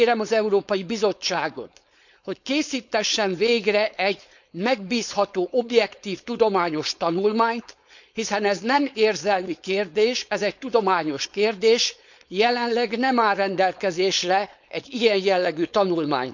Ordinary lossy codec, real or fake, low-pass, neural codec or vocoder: none; fake; 7.2 kHz; codec, 16 kHz, 4.8 kbps, FACodec